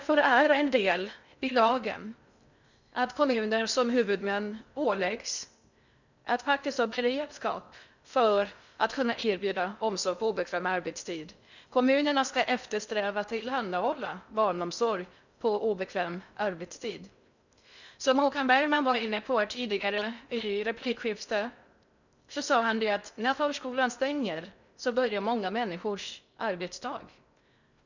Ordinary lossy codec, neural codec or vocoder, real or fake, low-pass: none; codec, 16 kHz in and 24 kHz out, 0.6 kbps, FocalCodec, streaming, 2048 codes; fake; 7.2 kHz